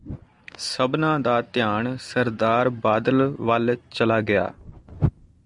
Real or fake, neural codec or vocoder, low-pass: real; none; 10.8 kHz